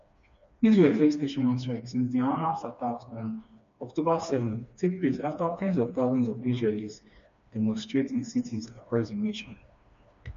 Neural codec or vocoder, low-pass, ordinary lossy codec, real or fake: codec, 16 kHz, 2 kbps, FreqCodec, smaller model; 7.2 kHz; MP3, 48 kbps; fake